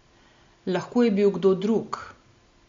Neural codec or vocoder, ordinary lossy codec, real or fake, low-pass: none; MP3, 48 kbps; real; 7.2 kHz